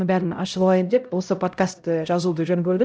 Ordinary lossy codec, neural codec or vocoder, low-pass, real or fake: none; codec, 16 kHz, 0.5 kbps, X-Codec, HuBERT features, trained on LibriSpeech; none; fake